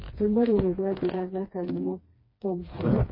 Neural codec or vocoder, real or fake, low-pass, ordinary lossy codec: codec, 16 kHz, 2 kbps, FreqCodec, smaller model; fake; 5.4 kHz; MP3, 24 kbps